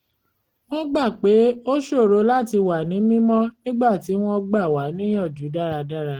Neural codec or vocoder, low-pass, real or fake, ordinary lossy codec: none; 19.8 kHz; real; Opus, 16 kbps